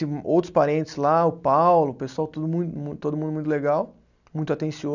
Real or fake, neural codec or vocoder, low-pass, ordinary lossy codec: real; none; 7.2 kHz; none